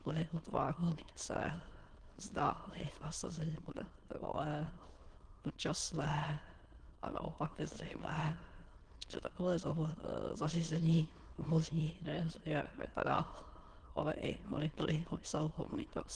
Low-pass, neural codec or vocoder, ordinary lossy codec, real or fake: 9.9 kHz; autoencoder, 22.05 kHz, a latent of 192 numbers a frame, VITS, trained on many speakers; Opus, 16 kbps; fake